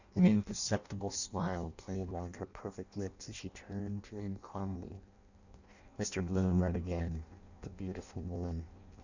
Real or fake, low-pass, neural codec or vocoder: fake; 7.2 kHz; codec, 16 kHz in and 24 kHz out, 0.6 kbps, FireRedTTS-2 codec